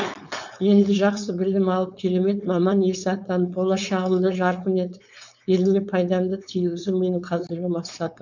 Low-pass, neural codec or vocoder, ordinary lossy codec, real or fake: 7.2 kHz; codec, 16 kHz, 4.8 kbps, FACodec; none; fake